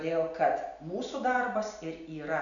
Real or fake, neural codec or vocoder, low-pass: real; none; 7.2 kHz